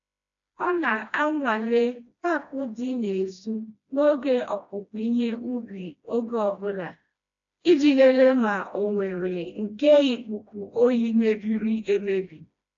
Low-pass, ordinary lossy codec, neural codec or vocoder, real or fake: 7.2 kHz; AAC, 64 kbps; codec, 16 kHz, 1 kbps, FreqCodec, smaller model; fake